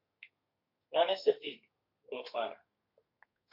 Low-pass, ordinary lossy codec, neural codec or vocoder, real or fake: 5.4 kHz; MP3, 48 kbps; codec, 32 kHz, 1.9 kbps, SNAC; fake